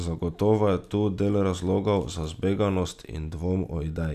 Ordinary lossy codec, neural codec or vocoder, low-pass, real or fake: none; none; 14.4 kHz; real